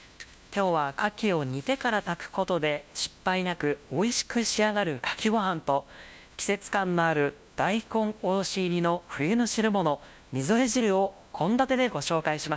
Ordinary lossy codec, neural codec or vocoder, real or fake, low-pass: none; codec, 16 kHz, 1 kbps, FunCodec, trained on LibriTTS, 50 frames a second; fake; none